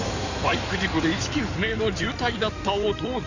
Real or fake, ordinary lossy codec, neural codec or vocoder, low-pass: fake; none; codec, 16 kHz in and 24 kHz out, 2.2 kbps, FireRedTTS-2 codec; 7.2 kHz